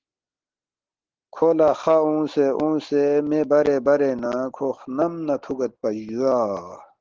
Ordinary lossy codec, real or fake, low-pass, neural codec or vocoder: Opus, 16 kbps; real; 7.2 kHz; none